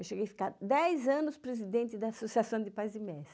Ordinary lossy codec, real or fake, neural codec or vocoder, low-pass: none; real; none; none